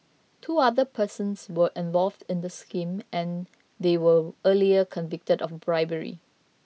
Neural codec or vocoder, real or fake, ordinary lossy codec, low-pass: none; real; none; none